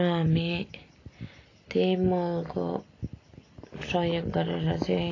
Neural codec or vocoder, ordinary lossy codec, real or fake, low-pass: none; AAC, 32 kbps; real; 7.2 kHz